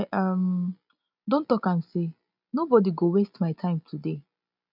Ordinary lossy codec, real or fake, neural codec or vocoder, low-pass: none; real; none; 5.4 kHz